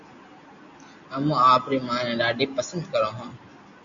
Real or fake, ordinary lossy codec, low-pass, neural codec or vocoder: real; MP3, 96 kbps; 7.2 kHz; none